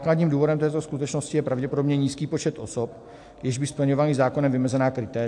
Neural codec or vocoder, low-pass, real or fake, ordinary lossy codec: none; 10.8 kHz; real; AAC, 64 kbps